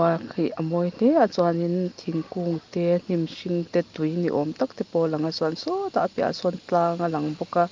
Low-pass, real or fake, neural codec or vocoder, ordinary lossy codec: 7.2 kHz; real; none; Opus, 32 kbps